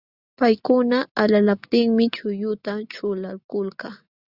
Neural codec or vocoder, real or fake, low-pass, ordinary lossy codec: none; real; 5.4 kHz; Opus, 64 kbps